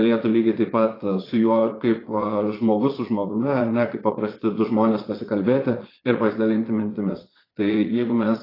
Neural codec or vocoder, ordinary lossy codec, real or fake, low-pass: vocoder, 22.05 kHz, 80 mel bands, Vocos; AAC, 24 kbps; fake; 5.4 kHz